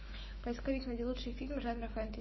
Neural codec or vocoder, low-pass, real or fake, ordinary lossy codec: autoencoder, 48 kHz, 128 numbers a frame, DAC-VAE, trained on Japanese speech; 7.2 kHz; fake; MP3, 24 kbps